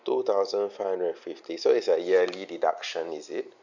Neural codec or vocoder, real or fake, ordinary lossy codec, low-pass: none; real; none; 7.2 kHz